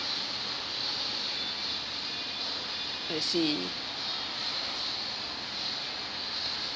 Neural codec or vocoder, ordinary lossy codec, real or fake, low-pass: none; none; real; none